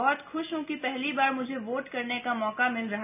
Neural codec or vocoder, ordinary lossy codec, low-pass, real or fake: none; none; 3.6 kHz; real